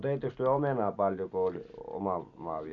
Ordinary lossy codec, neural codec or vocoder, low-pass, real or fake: none; none; 7.2 kHz; real